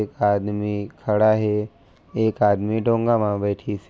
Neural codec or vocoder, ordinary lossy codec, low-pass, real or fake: none; none; none; real